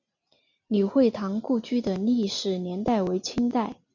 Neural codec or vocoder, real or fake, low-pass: none; real; 7.2 kHz